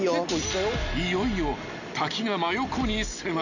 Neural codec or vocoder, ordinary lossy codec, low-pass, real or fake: none; none; 7.2 kHz; real